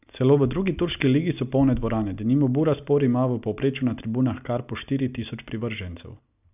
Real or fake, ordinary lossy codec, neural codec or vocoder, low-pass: real; none; none; 3.6 kHz